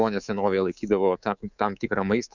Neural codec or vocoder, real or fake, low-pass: codec, 24 kHz, 3.1 kbps, DualCodec; fake; 7.2 kHz